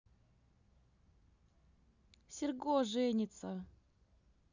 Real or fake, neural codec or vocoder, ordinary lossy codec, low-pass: real; none; none; 7.2 kHz